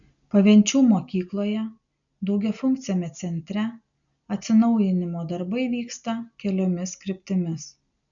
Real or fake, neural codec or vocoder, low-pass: real; none; 7.2 kHz